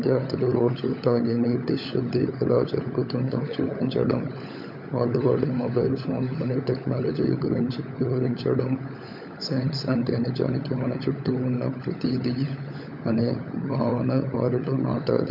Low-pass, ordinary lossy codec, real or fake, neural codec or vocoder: 5.4 kHz; none; fake; codec, 16 kHz, 16 kbps, FunCodec, trained on LibriTTS, 50 frames a second